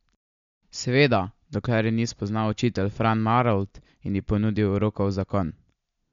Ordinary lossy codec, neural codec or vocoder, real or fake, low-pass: MP3, 64 kbps; none; real; 7.2 kHz